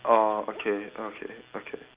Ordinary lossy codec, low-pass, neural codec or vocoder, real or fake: Opus, 32 kbps; 3.6 kHz; none; real